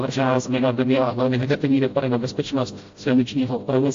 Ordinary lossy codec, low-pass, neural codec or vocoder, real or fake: AAC, 48 kbps; 7.2 kHz; codec, 16 kHz, 0.5 kbps, FreqCodec, smaller model; fake